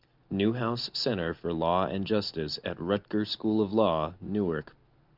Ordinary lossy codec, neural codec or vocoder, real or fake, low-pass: Opus, 24 kbps; none; real; 5.4 kHz